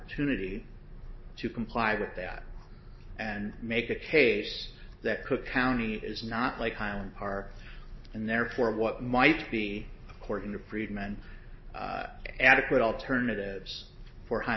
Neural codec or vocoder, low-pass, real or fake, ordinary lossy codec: none; 7.2 kHz; real; MP3, 24 kbps